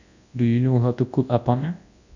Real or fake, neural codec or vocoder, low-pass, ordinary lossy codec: fake; codec, 24 kHz, 0.9 kbps, WavTokenizer, large speech release; 7.2 kHz; none